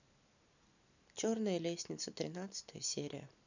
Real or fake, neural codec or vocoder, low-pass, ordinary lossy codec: real; none; 7.2 kHz; none